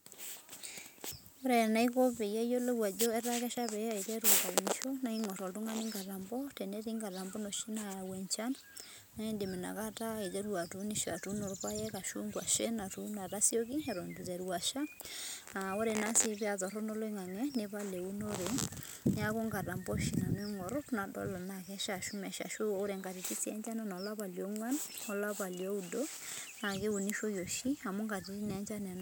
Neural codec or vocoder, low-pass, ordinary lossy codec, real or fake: none; none; none; real